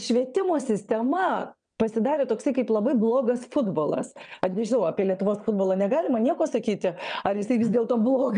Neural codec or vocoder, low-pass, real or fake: vocoder, 22.05 kHz, 80 mel bands, WaveNeXt; 9.9 kHz; fake